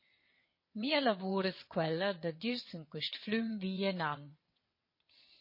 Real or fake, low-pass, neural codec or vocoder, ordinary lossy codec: fake; 5.4 kHz; vocoder, 22.05 kHz, 80 mel bands, WaveNeXt; MP3, 24 kbps